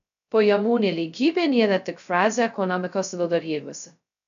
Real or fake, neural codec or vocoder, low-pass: fake; codec, 16 kHz, 0.2 kbps, FocalCodec; 7.2 kHz